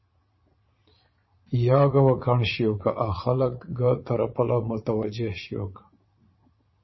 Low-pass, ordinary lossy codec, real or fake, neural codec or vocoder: 7.2 kHz; MP3, 24 kbps; fake; vocoder, 22.05 kHz, 80 mel bands, Vocos